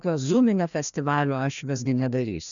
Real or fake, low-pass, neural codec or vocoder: fake; 7.2 kHz; codec, 16 kHz, 1 kbps, FreqCodec, larger model